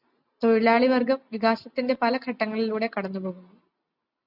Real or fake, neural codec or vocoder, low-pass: real; none; 5.4 kHz